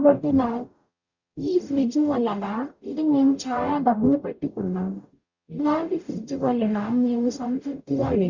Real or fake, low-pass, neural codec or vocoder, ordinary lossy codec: fake; 7.2 kHz; codec, 44.1 kHz, 0.9 kbps, DAC; Opus, 64 kbps